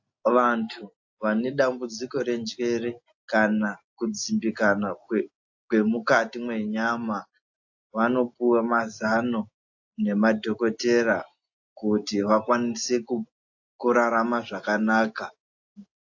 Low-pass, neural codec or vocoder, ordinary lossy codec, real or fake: 7.2 kHz; none; AAC, 48 kbps; real